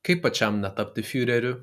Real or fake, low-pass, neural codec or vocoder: real; 14.4 kHz; none